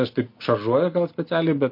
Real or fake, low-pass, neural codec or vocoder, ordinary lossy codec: real; 5.4 kHz; none; MP3, 32 kbps